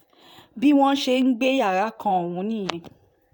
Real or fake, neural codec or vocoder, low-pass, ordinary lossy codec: fake; vocoder, 44.1 kHz, 128 mel bands, Pupu-Vocoder; 19.8 kHz; none